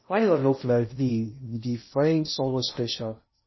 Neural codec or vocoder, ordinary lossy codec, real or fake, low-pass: codec, 16 kHz in and 24 kHz out, 0.6 kbps, FocalCodec, streaming, 2048 codes; MP3, 24 kbps; fake; 7.2 kHz